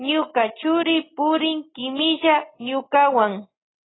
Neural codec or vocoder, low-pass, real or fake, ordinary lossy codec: none; 7.2 kHz; real; AAC, 16 kbps